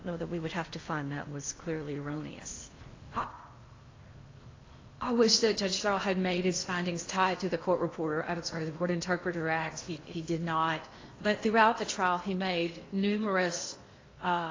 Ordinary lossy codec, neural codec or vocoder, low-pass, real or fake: AAC, 32 kbps; codec, 16 kHz in and 24 kHz out, 0.8 kbps, FocalCodec, streaming, 65536 codes; 7.2 kHz; fake